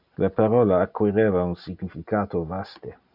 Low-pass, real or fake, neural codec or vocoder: 5.4 kHz; real; none